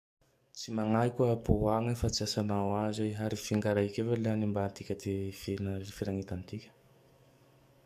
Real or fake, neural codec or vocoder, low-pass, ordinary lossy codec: fake; codec, 44.1 kHz, 7.8 kbps, DAC; 14.4 kHz; AAC, 96 kbps